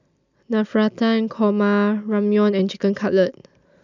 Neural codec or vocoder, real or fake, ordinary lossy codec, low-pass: none; real; none; 7.2 kHz